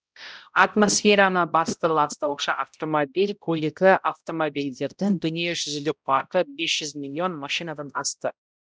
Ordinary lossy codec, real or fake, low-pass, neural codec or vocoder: none; fake; none; codec, 16 kHz, 0.5 kbps, X-Codec, HuBERT features, trained on balanced general audio